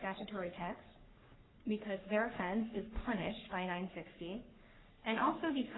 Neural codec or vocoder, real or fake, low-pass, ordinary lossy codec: codec, 44.1 kHz, 3.4 kbps, Pupu-Codec; fake; 7.2 kHz; AAC, 16 kbps